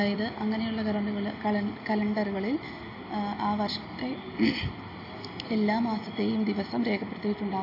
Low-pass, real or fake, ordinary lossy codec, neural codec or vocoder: 5.4 kHz; real; AAC, 32 kbps; none